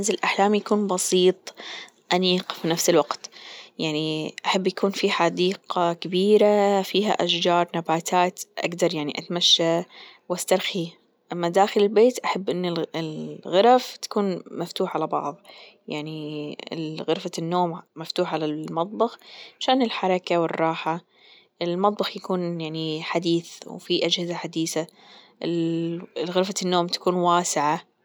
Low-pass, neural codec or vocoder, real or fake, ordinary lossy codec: none; none; real; none